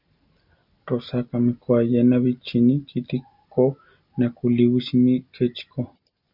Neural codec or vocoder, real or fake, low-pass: none; real; 5.4 kHz